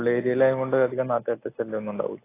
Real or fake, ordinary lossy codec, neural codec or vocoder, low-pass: real; AAC, 24 kbps; none; 3.6 kHz